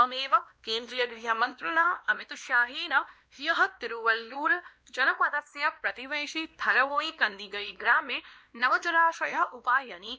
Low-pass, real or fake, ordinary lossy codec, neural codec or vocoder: none; fake; none; codec, 16 kHz, 1 kbps, X-Codec, WavLM features, trained on Multilingual LibriSpeech